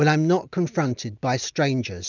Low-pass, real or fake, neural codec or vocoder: 7.2 kHz; real; none